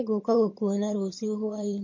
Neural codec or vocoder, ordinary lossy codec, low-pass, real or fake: vocoder, 22.05 kHz, 80 mel bands, HiFi-GAN; MP3, 32 kbps; 7.2 kHz; fake